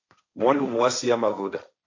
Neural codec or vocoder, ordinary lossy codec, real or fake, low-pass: codec, 16 kHz, 1.1 kbps, Voila-Tokenizer; AAC, 48 kbps; fake; 7.2 kHz